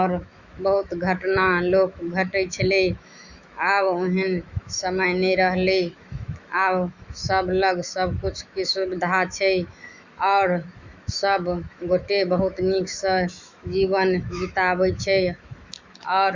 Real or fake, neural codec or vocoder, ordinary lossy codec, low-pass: fake; autoencoder, 48 kHz, 128 numbers a frame, DAC-VAE, trained on Japanese speech; Opus, 64 kbps; 7.2 kHz